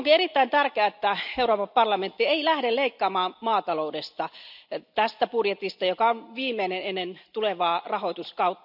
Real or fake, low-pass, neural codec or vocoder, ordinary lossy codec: real; 5.4 kHz; none; none